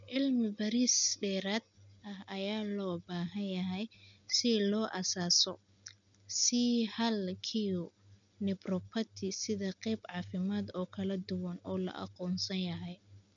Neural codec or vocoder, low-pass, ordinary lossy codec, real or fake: none; 7.2 kHz; none; real